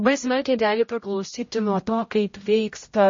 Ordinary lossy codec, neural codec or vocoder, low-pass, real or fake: MP3, 32 kbps; codec, 16 kHz, 0.5 kbps, X-Codec, HuBERT features, trained on general audio; 7.2 kHz; fake